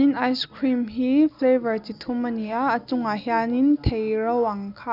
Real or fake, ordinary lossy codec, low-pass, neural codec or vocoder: real; none; 5.4 kHz; none